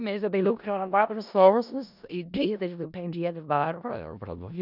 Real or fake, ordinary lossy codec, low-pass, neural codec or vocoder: fake; none; 5.4 kHz; codec, 16 kHz in and 24 kHz out, 0.4 kbps, LongCat-Audio-Codec, four codebook decoder